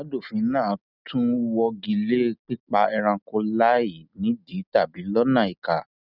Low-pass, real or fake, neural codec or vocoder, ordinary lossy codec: 5.4 kHz; real; none; none